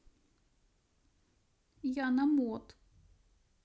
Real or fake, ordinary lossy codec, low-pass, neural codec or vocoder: real; none; none; none